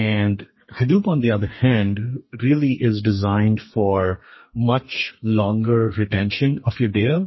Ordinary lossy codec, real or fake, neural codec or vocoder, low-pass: MP3, 24 kbps; fake; codec, 32 kHz, 1.9 kbps, SNAC; 7.2 kHz